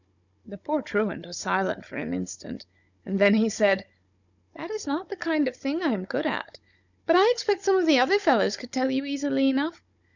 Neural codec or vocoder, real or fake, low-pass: codec, 16 kHz, 16 kbps, FunCodec, trained on Chinese and English, 50 frames a second; fake; 7.2 kHz